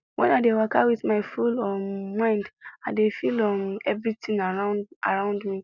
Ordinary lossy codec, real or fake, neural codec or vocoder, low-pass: none; real; none; 7.2 kHz